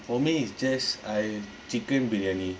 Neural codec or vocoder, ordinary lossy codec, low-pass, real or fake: none; none; none; real